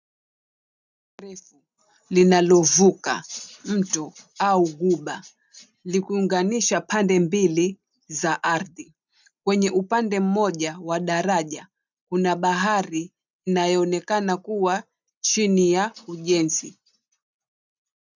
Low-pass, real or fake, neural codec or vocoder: 7.2 kHz; real; none